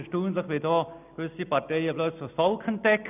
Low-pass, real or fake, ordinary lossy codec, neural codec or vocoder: 3.6 kHz; real; none; none